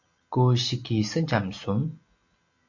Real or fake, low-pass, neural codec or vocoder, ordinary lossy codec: real; 7.2 kHz; none; AAC, 48 kbps